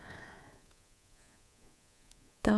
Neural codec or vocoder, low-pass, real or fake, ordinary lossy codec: codec, 24 kHz, 3.1 kbps, DualCodec; none; fake; none